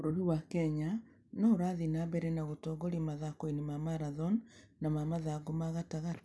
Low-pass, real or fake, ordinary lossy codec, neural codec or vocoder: none; real; none; none